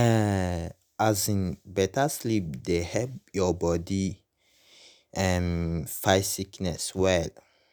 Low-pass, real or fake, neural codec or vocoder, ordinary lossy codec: none; real; none; none